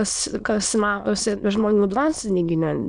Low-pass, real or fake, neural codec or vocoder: 9.9 kHz; fake; autoencoder, 22.05 kHz, a latent of 192 numbers a frame, VITS, trained on many speakers